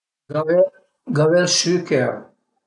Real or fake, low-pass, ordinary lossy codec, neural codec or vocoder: real; 10.8 kHz; none; none